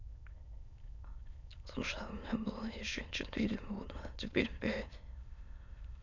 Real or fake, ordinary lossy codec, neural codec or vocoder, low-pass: fake; none; autoencoder, 22.05 kHz, a latent of 192 numbers a frame, VITS, trained on many speakers; 7.2 kHz